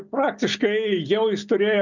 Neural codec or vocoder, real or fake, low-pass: none; real; 7.2 kHz